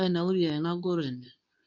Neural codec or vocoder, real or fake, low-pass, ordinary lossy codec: codec, 24 kHz, 0.9 kbps, WavTokenizer, medium speech release version 2; fake; 7.2 kHz; none